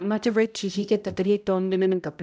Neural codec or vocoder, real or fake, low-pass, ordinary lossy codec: codec, 16 kHz, 0.5 kbps, X-Codec, HuBERT features, trained on balanced general audio; fake; none; none